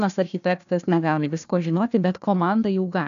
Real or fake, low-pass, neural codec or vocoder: fake; 7.2 kHz; codec, 16 kHz, 1 kbps, FunCodec, trained on Chinese and English, 50 frames a second